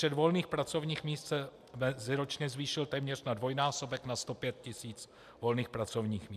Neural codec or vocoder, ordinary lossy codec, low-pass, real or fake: none; MP3, 96 kbps; 14.4 kHz; real